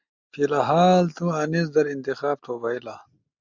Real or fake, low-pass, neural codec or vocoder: real; 7.2 kHz; none